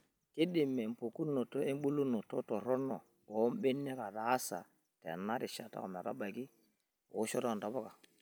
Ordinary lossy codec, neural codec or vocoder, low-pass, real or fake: none; none; none; real